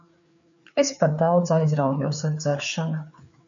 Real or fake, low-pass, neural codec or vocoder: fake; 7.2 kHz; codec, 16 kHz, 4 kbps, FreqCodec, larger model